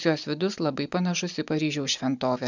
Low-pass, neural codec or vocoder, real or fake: 7.2 kHz; none; real